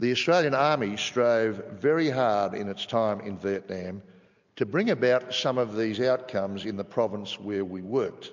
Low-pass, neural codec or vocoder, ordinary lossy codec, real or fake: 7.2 kHz; none; MP3, 64 kbps; real